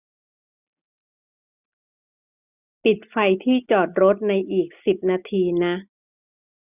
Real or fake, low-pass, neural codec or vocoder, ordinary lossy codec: real; 3.6 kHz; none; none